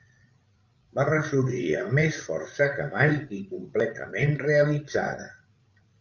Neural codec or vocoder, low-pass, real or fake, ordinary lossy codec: codec, 16 kHz, 16 kbps, FreqCodec, larger model; 7.2 kHz; fake; Opus, 24 kbps